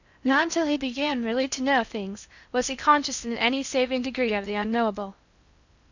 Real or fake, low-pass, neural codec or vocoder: fake; 7.2 kHz; codec, 16 kHz in and 24 kHz out, 0.8 kbps, FocalCodec, streaming, 65536 codes